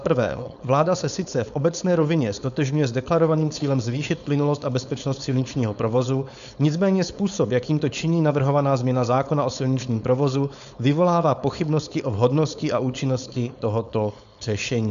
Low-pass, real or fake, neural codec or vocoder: 7.2 kHz; fake; codec, 16 kHz, 4.8 kbps, FACodec